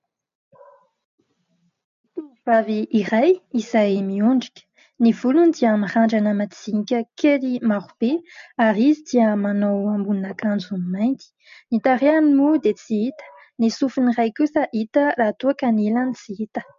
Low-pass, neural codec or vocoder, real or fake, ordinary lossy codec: 7.2 kHz; none; real; MP3, 48 kbps